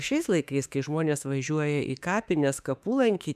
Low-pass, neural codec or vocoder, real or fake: 14.4 kHz; autoencoder, 48 kHz, 32 numbers a frame, DAC-VAE, trained on Japanese speech; fake